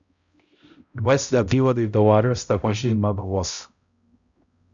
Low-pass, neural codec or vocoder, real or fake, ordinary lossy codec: 7.2 kHz; codec, 16 kHz, 0.5 kbps, X-Codec, HuBERT features, trained on balanced general audio; fake; Opus, 64 kbps